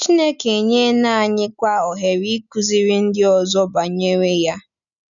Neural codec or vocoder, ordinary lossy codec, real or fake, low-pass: none; none; real; 7.2 kHz